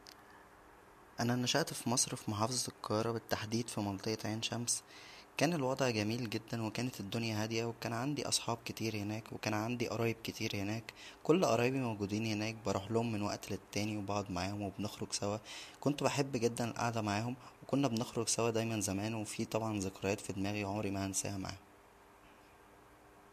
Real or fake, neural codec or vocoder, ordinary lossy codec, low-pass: real; none; MP3, 64 kbps; 14.4 kHz